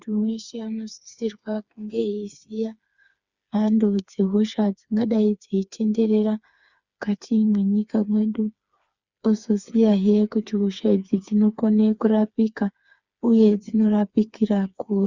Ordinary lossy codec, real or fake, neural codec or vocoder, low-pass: Opus, 64 kbps; fake; codec, 16 kHz, 4 kbps, FreqCodec, smaller model; 7.2 kHz